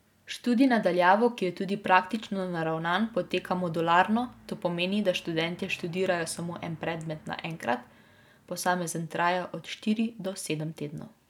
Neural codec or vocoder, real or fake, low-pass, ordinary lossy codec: none; real; 19.8 kHz; none